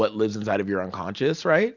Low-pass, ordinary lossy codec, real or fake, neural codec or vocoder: 7.2 kHz; Opus, 64 kbps; real; none